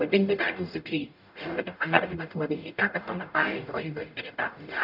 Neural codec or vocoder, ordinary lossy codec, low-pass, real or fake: codec, 44.1 kHz, 0.9 kbps, DAC; none; 5.4 kHz; fake